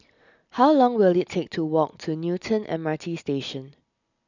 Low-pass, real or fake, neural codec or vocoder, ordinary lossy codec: 7.2 kHz; real; none; none